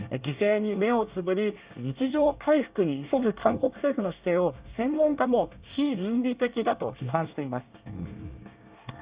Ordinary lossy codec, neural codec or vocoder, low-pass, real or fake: Opus, 64 kbps; codec, 24 kHz, 1 kbps, SNAC; 3.6 kHz; fake